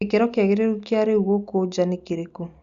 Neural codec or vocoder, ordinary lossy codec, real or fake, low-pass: none; Opus, 64 kbps; real; 7.2 kHz